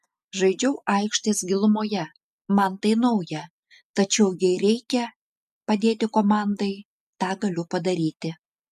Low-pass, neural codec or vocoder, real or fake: 14.4 kHz; none; real